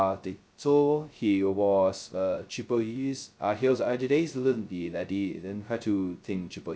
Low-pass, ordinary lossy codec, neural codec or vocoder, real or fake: none; none; codec, 16 kHz, 0.2 kbps, FocalCodec; fake